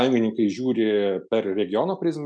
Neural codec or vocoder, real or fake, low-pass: none; real; 9.9 kHz